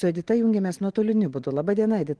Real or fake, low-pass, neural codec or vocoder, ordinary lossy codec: real; 9.9 kHz; none; Opus, 16 kbps